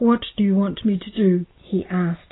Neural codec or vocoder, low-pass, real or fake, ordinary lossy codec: none; 7.2 kHz; real; AAC, 16 kbps